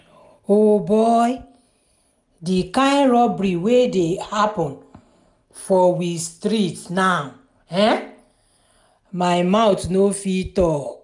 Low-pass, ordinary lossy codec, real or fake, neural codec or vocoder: 10.8 kHz; none; real; none